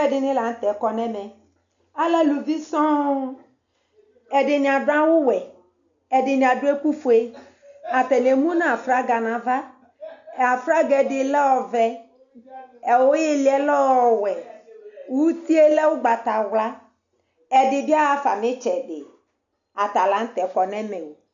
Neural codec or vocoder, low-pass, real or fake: none; 7.2 kHz; real